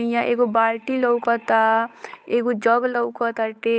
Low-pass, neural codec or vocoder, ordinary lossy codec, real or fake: none; codec, 16 kHz, 8 kbps, FunCodec, trained on Chinese and English, 25 frames a second; none; fake